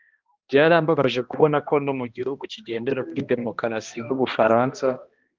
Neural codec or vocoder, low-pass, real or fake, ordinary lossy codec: codec, 16 kHz, 1 kbps, X-Codec, HuBERT features, trained on balanced general audio; 7.2 kHz; fake; Opus, 32 kbps